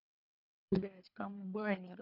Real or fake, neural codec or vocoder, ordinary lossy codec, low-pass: fake; codec, 24 kHz, 3 kbps, HILCodec; none; 5.4 kHz